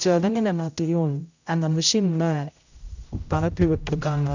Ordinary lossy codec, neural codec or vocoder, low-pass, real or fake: none; codec, 16 kHz, 0.5 kbps, X-Codec, HuBERT features, trained on general audio; 7.2 kHz; fake